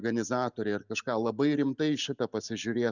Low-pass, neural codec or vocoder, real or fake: 7.2 kHz; none; real